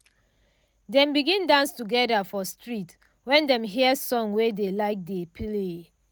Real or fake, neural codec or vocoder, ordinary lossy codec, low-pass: real; none; none; none